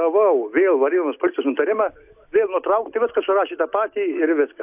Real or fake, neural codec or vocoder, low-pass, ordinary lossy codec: real; none; 3.6 kHz; AAC, 32 kbps